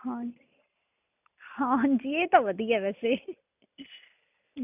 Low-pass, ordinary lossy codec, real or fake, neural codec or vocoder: 3.6 kHz; none; real; none